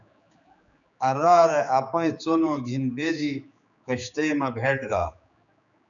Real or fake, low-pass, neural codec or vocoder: fake; 7.2 kHz; codec, 16 kHz, 4 kbps, X-Codec, HuBERT features, trained on general audio